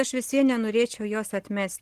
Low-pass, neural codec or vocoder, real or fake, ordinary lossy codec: 14.4 kHz; none; real; Opus, 16 kbps